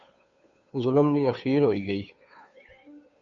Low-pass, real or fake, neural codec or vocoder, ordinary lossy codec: 7.2 kHz; fake; codec, 16 kHz, 2 kbps, FunCodec, trained on Chinese and English, 25 frames a second; AAC, 64 kbps